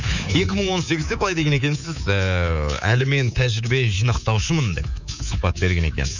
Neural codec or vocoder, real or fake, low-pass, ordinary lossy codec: codec, 24 kHz, 3.1 kbps, DualCodec; fake; 7.2 kHz; none